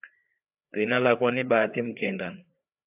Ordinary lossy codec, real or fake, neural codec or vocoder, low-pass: AAC, 32 kbps; fake; codec, 16 kHz, 4 kbps, FreqCodec, larger model; 3.6 kHz